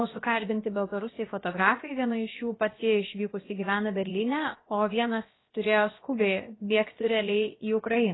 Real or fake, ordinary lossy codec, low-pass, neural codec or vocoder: fake; AAC, 16 kbps; 7.2 kHz; codec, 16 kHz, about 1 kbps, DyCAST, with the encoder's durations